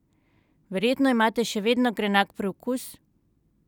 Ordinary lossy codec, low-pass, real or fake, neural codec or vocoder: none; 19.8 kHz; real; none